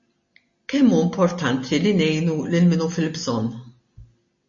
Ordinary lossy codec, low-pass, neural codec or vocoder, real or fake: MP3, 32 kbps; 7.2 kHz; none; real